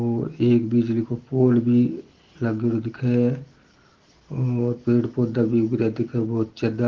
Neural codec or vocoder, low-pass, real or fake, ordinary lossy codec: none; 7.2 kHz; real; Opus, 16 kbps